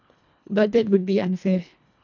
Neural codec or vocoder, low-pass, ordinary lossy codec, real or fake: codec, 24 kHz, 1.5 kbps, HILCodec; 7.2 kHz; none; fake